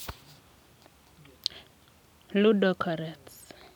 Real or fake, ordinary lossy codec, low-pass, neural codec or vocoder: fake; none; 19.8 kHz; vocoder, 44.1 kHz, 128 mel bands every 256 samples, BigVGAN v2